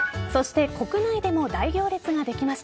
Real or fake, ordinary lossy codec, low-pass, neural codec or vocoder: real; none; none; none